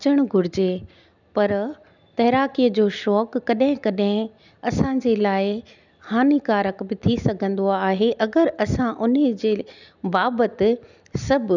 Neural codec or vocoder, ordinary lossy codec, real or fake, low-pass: none; none; real; 7.2 kHz